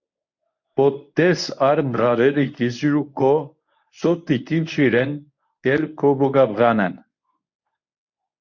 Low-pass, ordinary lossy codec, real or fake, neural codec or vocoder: 7.2 kHz; MP3, 48 kbps; fake; codec, 24 kHz, 0.9 kbps, WavTokenizer, medium speech release version 1